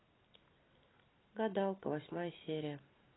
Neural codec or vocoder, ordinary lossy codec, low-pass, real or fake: none; AAC, 16 kbps; 7.2 kHz; real